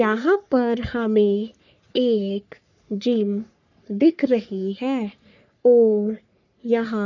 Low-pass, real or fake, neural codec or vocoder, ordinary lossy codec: 7.2 kHz; fake; codec, 44.1 kHz, 3.4 kbps, Pupu-Codec; none